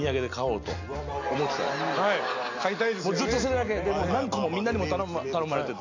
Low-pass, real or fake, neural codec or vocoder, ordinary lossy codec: 7.2 kHz; real; none; AAC, 48 kbps